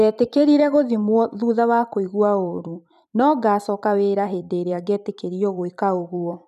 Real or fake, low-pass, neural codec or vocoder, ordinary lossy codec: fake; 14.4 kHz; vocoder, 44.1 kHz, 128 mel bands every 256 samples, BigVGAN v2; none